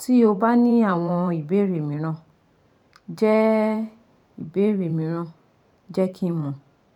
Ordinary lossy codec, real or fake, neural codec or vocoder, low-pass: none; fake; vocoder, 44.1 kHz, 128 mel bands every 512 samples, BigVGAN v2; 19.8 kHz